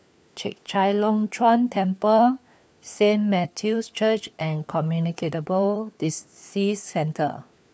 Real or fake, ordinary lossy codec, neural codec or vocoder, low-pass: fake; none; codec, 16 kHz, 4 kbps, FunCodec, trained on LibriTTS, 50 frames a second; none